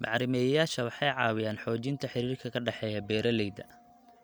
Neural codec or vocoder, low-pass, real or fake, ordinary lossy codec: none; none; real; none